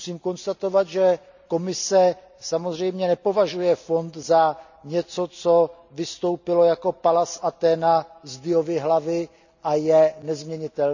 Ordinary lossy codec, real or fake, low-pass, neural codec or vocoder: none; real; 7.2 kHz; none